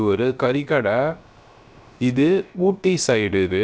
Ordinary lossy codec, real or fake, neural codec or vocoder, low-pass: none; fake; codec, 16 kHz, 0.3 kbps, FocalCodec; none